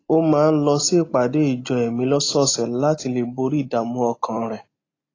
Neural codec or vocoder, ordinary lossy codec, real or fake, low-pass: none; AAC, 32 kbps; real; 7.2 kHz